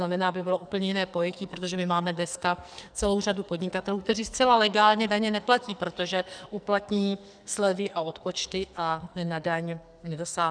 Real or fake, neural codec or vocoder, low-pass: fake; codec, 44.1 kHz, 2.6 kbps, SNAC; 9.9 kHz